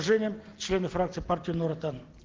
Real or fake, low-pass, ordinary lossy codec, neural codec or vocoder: real; 7.2 kHz; Opus, 16 kbps; none